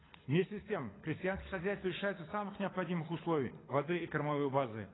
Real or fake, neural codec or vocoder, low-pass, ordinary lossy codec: fake; codec, 24 kHz, 6 kbps, HILCodec; 7.2 kHz; AAC, 16 kbps